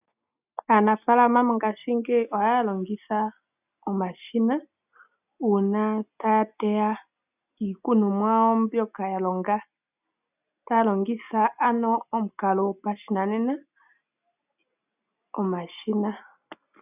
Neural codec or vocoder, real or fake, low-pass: none; real; 3.6 kHz